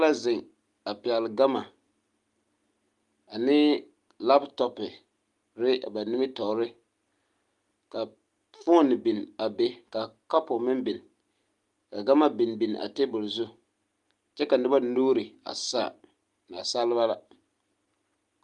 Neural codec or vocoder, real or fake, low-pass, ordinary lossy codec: none; real; 10.8 kHz; Opus, 32 kbps